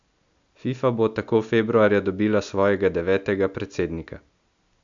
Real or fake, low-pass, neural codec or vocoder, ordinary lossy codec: real; 7.2 kHz; none; MP3, 64 kbps